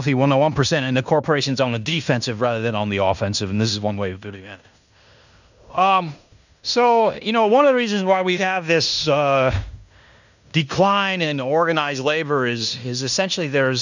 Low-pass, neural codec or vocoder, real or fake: 7.2 kHz; codec, 16 kHz in and 24 kHz out, 0.9 kbps, LongCat-Audio-Codec, fine tuned four codebook decoder; fake